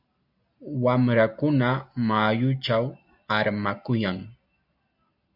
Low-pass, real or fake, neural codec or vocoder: 5.4 kHz; real; none